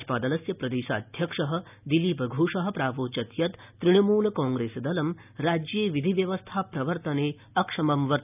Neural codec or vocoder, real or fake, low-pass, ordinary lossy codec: none; real; 3.6 kHz; none